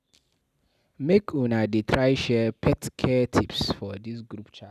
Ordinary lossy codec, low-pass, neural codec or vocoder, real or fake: none; 14.4 kHz; none; real